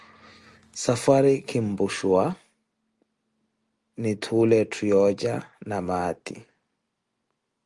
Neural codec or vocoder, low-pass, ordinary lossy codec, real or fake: none; 10.8 kHz; Opus, 32 kbps; real